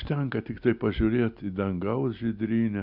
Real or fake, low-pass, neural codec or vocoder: real; 5.4 kHz; none